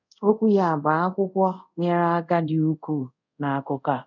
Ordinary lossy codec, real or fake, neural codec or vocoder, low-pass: none; fake; codec, 24 kHz, 0.5 kbps, DualCodec; 7.2 kHz